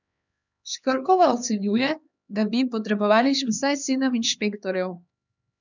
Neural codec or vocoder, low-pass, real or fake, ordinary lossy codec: codec, 16 kHz, 2 kbps, X-Codec, HuBERT features, trained on LibriSpeech; 7.2 kHz; fake; none